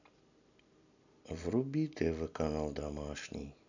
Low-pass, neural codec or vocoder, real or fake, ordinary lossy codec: 7.2 kHz; vocoder, 44.1 kHz, 128 mel bands every 256 samples, BigVGAN v2; fake; none